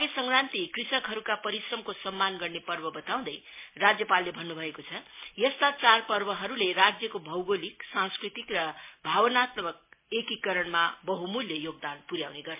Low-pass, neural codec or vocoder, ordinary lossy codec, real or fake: 3.6 kHz; none; MP3, 24 kbps; real